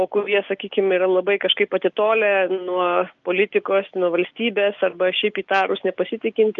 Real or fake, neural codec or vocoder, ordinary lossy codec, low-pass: real; none; Opus, 24 kbps; 7.2 kHz